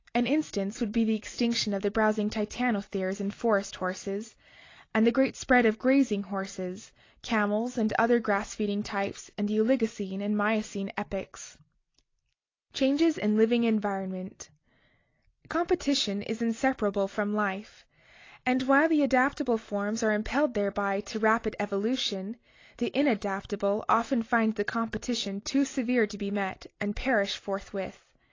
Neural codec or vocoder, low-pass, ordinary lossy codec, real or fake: none; 7.2 kHz; AAC, 32 kbps; real